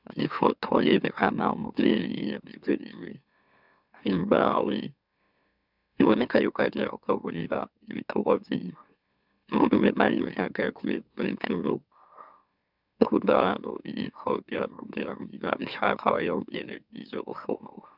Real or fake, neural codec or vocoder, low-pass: fake; autoencoder, 44.1 kHz, a latent of 192 numbers a frame, MeloTTS; 5.4 kHz